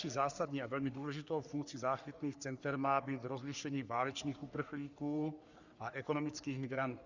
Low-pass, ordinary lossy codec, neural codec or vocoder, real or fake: 7.2 kHz; Opus, 64 kbps; codec, 44.1 kHz, 3.4 kbps, Pupu-Codec; fake